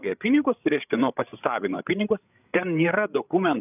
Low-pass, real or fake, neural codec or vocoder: 3.6 kHz; fake; codec, 16 kHz, 8 kbps, FreqCodec, larger model